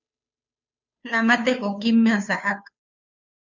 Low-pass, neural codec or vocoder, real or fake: 7.2 kHz; codec, 16 kHz, 2 kbps, FunCodec, trained on Chinese and English, 25 frames a second; fake